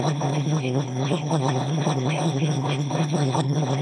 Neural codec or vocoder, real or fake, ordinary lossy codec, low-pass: autoencoder, 22.05 kHz, a latent of 192 numbers a frame, VITS, trained on one speaker; fake; none; none